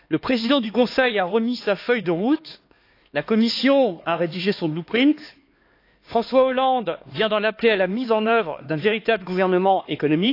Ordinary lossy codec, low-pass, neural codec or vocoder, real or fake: AAC, 32 kbps; 5.4 kHz; codec, 16 kHz, 2 kbps, X-Codec, HuBERT features, trained on LibriSpeech; fake